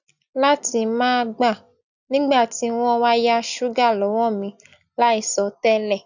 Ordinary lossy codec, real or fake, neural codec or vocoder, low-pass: none; real; none; 7.2 kHz